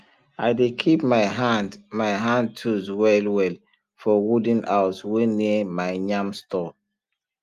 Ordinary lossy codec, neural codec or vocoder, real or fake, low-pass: Opus, 32 kbps; none; real; 14.4 kHz